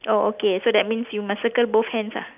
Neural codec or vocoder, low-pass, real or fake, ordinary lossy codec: none; 3.6 kHz; real; none